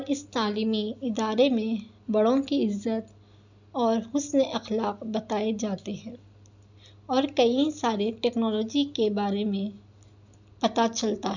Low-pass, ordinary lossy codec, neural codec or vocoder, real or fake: 7.2 kHz; none; none; real